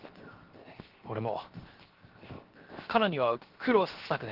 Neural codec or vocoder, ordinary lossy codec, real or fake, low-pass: codec, 16 kHz, 0.7 kbps, FocalCodec; Opus, 16 kbps; fake; 5.4 kHz